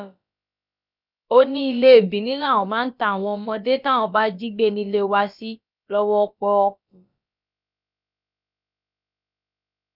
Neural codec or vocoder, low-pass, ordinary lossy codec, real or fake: codec, 16 kHz, about 1 kbps, DyCAST, with the encoder's durations; 5.4 kHz; none; fake